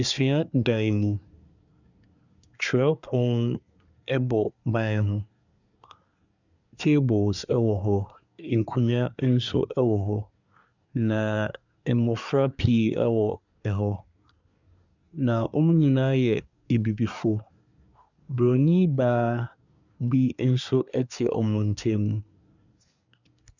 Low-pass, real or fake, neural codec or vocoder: 7.2 kHz; fake; codec, 24 kHz, 1 kbps, SNAC